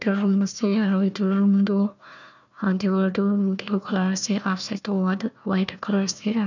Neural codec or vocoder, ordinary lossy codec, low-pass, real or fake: codec, 16 kHz, 1 kbps, FunCodec, trained on Chinese and English, 50 frames a second; none; 7.2 kHz; fake